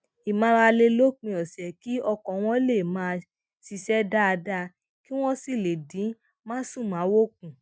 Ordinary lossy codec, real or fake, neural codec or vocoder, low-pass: none; real; none; none